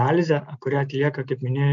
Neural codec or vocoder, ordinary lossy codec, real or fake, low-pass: none; MP3, 64 kbps; real; 7.2 kHz